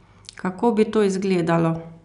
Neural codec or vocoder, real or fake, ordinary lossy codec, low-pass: none; real; none; 10.8 kHz